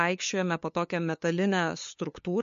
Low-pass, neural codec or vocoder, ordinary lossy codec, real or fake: 7.2 kHz; codec, 16 kHz, 2 kbps, FunCodec, trained on LibriTTS, 25 frames a second; MP3, 48 kbps; fake